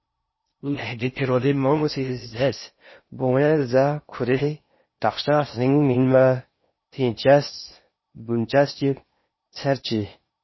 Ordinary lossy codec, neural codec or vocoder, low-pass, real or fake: MP3, 24 kbps; codec, 16 kHz in and 24 kHz out, 0.6 kbps, FocalCodec, streaming, 4096 codes; 7.2 kHz; fake